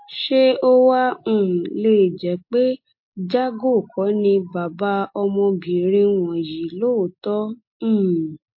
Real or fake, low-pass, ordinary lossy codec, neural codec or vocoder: real; 5.4 kHz; MP3, 32 kbps; none